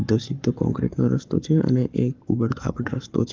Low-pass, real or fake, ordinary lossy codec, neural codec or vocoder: 7.2 kHz; fake; Opus, 24 kbps; codec, 44.1 kHz, 7.8 kbps, Pupu-Codec